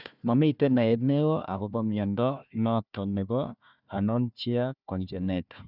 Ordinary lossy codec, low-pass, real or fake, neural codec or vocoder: none; 5.4 kHz; fake; codec, 16 kHz, 1 kbps, FunCodec, trained on Chinese and English, 50 frames a second